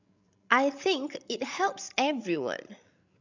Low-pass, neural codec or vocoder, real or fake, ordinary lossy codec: 7.2 kHz; codec, 16 kHz, 16 kbps, FreqCodec, larger model; fake; none